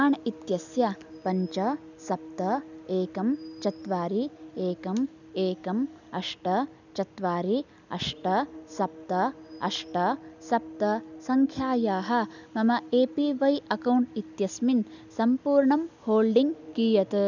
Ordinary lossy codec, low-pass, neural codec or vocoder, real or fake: none; 7.2 kHz; none; real